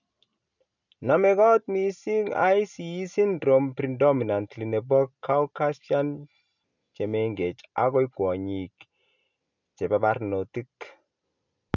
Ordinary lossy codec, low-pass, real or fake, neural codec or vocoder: none; 7.2 kHz; real; none